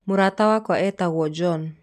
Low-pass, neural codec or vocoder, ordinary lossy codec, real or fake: 14.4 kHz; none; none; real